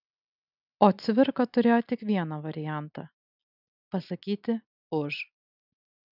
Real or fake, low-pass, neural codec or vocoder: real; 5.4 kHz; none